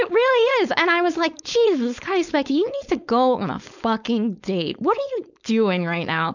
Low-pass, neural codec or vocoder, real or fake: 7.2 kHz; codec, 16 kHz, 4.8 kbps, FACodec; fake